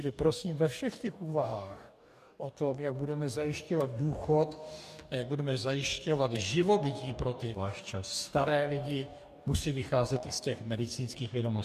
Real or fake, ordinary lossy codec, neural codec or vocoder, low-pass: fake; MP3, 96 kbps; codec, 44.1 kHz, 2.6 kbps, DAC; 14.4 kHz